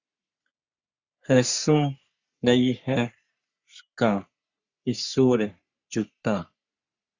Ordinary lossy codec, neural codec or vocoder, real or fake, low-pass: Opus, 64 kbps; codec, 44.1 kHz, 3.4 kbps, Pupu-Codec; fake; 7.2 kHz